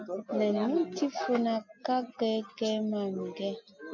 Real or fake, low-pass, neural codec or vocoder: real; 7.2 kHz; none